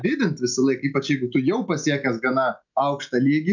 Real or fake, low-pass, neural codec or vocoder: real; 7.2 kHz; none